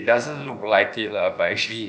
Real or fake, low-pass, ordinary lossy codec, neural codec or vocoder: fake; none; none; codec, 16 kHz, about 1 kbps, DyCAST, with the encoder's durations